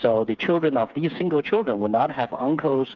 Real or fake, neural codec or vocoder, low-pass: fake; codec, 16 kHz, 4 kbps, FreqCodec, smaller model; 7.2 kHz